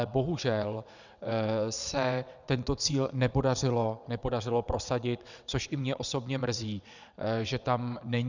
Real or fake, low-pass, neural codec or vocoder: fake; 7.2 kHz; vocoder, 22.05 kHz, 80 mel bands, WaveNeXt